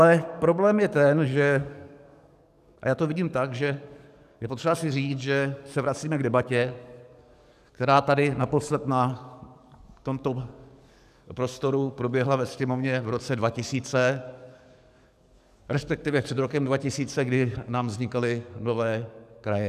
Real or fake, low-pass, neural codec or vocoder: fake; 14.4 kHz; codec, 44.1 kHz, 7.8 kbps, DAC